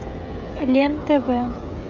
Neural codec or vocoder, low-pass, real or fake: codec, 16 kHz, 16 kbps, FreqCodec, smaller model; 7.2 kHz; fake